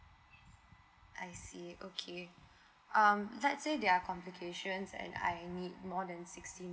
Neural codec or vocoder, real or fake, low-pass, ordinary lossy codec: none; real; none; none